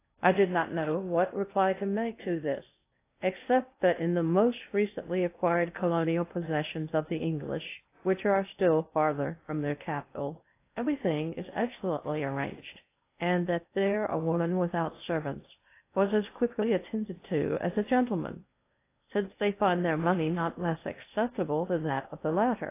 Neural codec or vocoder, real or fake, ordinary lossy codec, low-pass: codec, 16 kHz in and 24 kHz out, 0.6 kbps, FocalCodec, streaming, 2048 codes; fake; AAC, 24 kbps; 3.6 kHz